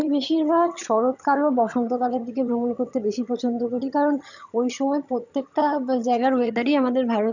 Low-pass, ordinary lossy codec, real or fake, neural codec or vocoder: 7.2 kHz; none; fake; vocoder, 22.05 kHz, 80 mel bands, HiFi-GAN